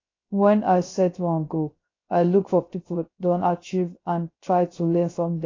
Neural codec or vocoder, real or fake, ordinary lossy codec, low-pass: codec, 16 kHz, 0.3 kbps, FocalCodec; fake; AAC, 32 kbps; 7.2 kHz